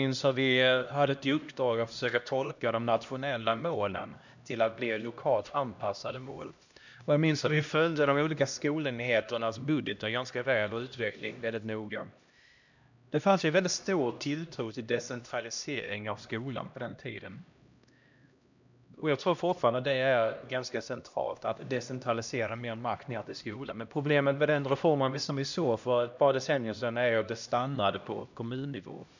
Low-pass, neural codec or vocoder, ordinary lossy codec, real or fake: 7.2 kHz; codec, 16 kHz, 1 kbps, X-Codec, HuBERT features, trained on LibriSpeech; none; fake